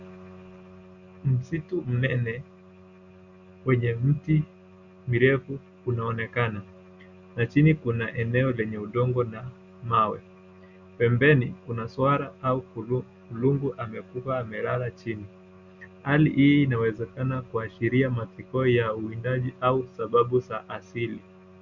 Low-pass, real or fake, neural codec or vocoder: 7.2 kHz; real; none